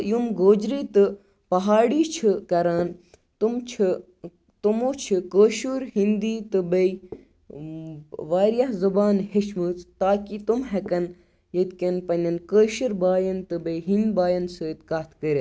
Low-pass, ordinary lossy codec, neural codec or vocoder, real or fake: none; none; none; real